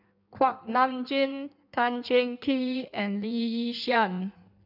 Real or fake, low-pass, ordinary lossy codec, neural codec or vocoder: fake; 5.4 kHz; none; codec, 16 kHz in and 24 kHz out, 1.1 kbps, FireRedTTS-2 codec